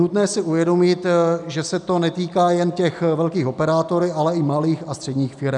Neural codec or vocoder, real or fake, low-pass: none; real; 10.8 kHz